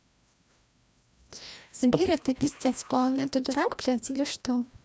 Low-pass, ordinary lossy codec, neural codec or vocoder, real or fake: none; none; codec, 16 kHz, 1 kbps, FreqCodec, larger model; fake